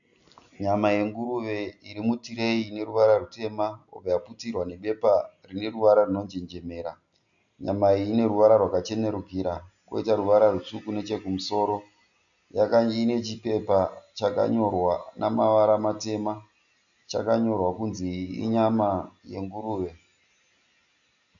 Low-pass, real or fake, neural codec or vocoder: 7.2 kHz; real; none